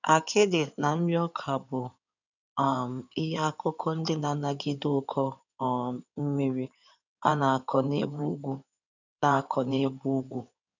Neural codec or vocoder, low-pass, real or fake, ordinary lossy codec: codec, 16 kHz in and 24 kHz out, 2.2 kbps, FireRedTTS-2 codec; 7.2 kHz; fake; none